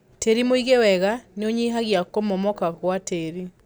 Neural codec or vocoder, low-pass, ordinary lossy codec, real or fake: none; none; none; real